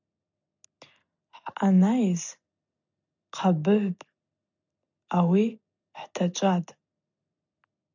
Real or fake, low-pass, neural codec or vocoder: real; 7.2 kHz; none